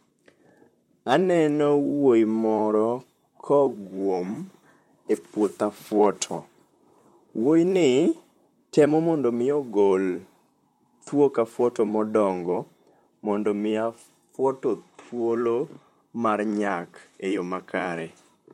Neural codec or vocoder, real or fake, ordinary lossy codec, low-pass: vocoder, 44.1 kHz, 128 mel bands, Pupu-Vocoder; fake; MP3, 64 kbps; 19.8 kHz